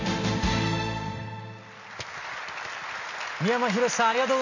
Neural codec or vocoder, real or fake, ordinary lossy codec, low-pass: none; real; none; 7.2 kHz